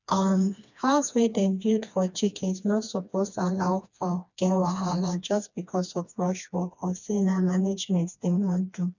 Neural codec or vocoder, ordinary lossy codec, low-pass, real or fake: codec, 16 kHz, 2 kbps, FreqCodec, smaller model; none; 7.2 kHz; fake